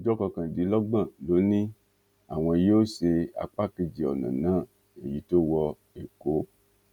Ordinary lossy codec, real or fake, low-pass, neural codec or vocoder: none; real; 19.8 kHz; none